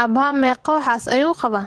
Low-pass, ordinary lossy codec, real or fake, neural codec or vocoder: 9.9 kHz; Opus, 16 kbps; fake; vocoder, 22.05 kHz, 80 mel bands, WaveNeXt